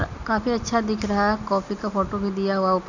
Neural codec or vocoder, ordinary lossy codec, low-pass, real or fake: none; none; 7.2 kHz; real